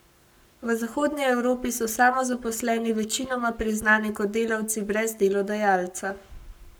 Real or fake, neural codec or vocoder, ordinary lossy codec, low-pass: fake; codec, 44.1 kHz, 7.8 kbps, Pupu-Codec; none; none